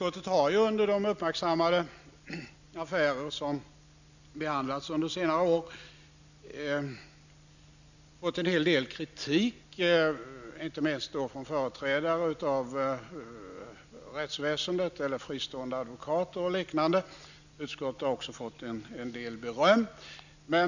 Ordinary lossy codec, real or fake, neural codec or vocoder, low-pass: none; real; none; 7.2 kHz